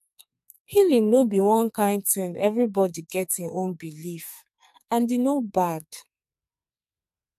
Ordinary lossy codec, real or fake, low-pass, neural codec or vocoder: MP3, 96 kbps; fake; 14.4 kHz; codec, 44.1 kHz, 2.6 kbps, SNAC